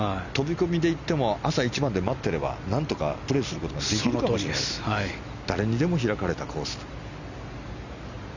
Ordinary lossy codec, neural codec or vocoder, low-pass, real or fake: none; none; 7.2 kHz; real